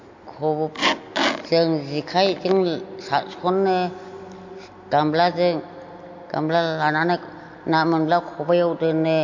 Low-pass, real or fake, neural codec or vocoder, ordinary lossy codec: 7.2 kHz; real; none; MP3, 48 kbps